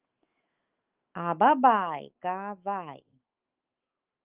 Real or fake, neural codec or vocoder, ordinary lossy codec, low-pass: fake; autoencoder, 48 kHz, 128 numbers a frame, DAC-VAE, trained on Japanese speech; Opus, 32 kbps; 3.6 kHz